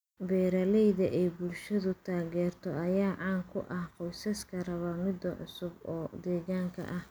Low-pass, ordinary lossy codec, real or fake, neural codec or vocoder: none; none; real; none